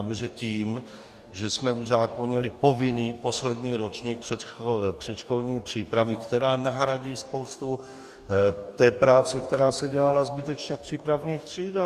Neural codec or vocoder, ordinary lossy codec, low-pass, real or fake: codec, 44.1 kHz, 2.6 kbps, DAC; Opus, 64 kbps; 14.4 kHz; fake